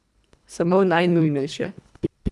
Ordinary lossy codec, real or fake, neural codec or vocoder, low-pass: none; fake; codec, 24 kHz, 1.5 kbps, HILCodec; none